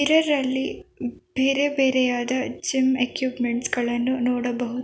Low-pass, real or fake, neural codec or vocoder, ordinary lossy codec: none; real; none; none